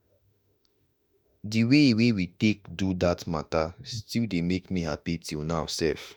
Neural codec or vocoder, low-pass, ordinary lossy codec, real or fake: autoencoder, 48 kHz, 32 numbers a frame, DAC-VAE, trained on Japanese speech; 19.8 kHz; none; fake